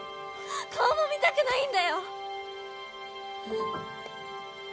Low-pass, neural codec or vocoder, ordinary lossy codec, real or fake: none; none; none; real